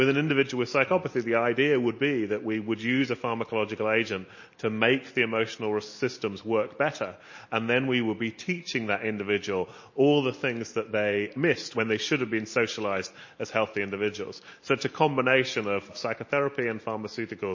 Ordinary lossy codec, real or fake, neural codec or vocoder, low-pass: MP3, 32 kbps; real; none; 7.2 kHz